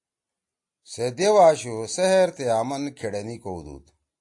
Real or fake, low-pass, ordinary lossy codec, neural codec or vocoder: real; 10.8 kHz; AAC, 64 kbps; none